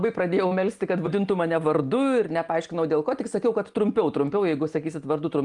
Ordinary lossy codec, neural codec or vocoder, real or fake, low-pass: Opus, 32 kbps; none; real; 10.8 kHz